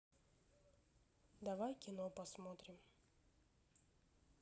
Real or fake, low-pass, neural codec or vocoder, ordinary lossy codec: real; none; none; none